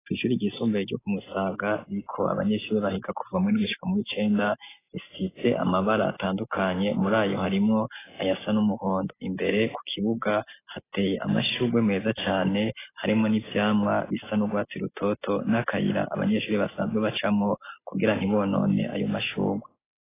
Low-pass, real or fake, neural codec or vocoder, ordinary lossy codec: 3.6 kHz; real; none; AAC, 16 kbps